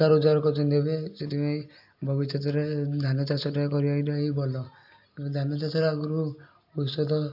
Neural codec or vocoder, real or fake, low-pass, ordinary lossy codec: none; real; 5.4 kHz; AAC, 48 kbps